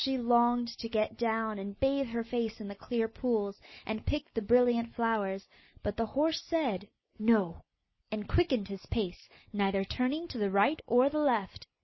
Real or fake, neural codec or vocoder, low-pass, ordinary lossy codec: real; none; 7.2 kHz; MP3, 24 kbps